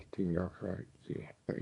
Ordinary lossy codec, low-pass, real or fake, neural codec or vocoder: none; 10.8 kHz; fake; codec, 24 kHz, 0.9 kbps, WavTokenizer, small release